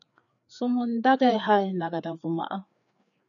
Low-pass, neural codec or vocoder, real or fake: 7.2 kHz; codec, 16 kHz, 4 kbps, FreqCodec, larger model; fake